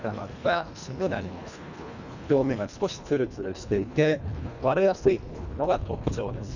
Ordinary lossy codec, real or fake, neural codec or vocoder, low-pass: none; fake; codec, 24 kHz, 1.5 kbps, HILCodec; 7.2 kHz